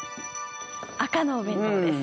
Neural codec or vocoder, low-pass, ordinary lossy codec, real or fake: none; none; none; real